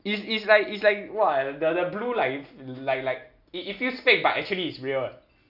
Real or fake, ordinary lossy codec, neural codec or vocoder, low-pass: real; none; none; 5.4 kHz